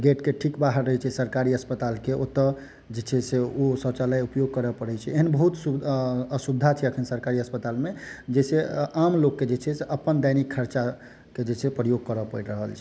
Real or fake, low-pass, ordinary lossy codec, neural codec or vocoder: real; none; none; none